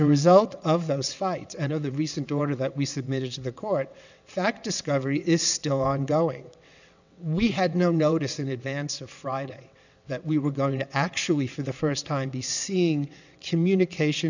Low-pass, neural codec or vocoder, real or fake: 7.2 kHz; vocoder, 22.05 kHz, 80 mel bands, WaveNeXt; fake